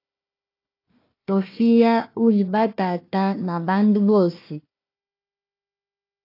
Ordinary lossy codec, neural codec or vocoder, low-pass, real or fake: AAC, 32 kbps; codec, 16 kHz, 1 kbps, FunCodec, trained on Chinese and English, 50 frames a second; 5.4 kHz; fake